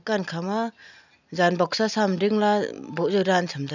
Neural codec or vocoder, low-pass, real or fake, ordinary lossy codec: none; 7.2 kHz; real; none